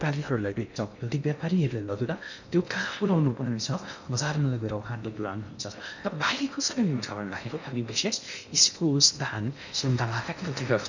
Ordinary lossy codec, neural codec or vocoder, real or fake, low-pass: none; codec, 16 kHz in and 24 kHz out, 0.6 kbps, FocalCodec, streaming, 2048 codes; fake; 7.2 kHz